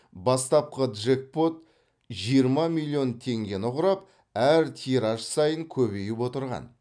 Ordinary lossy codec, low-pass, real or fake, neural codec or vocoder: none; 9.9 kHz; real; none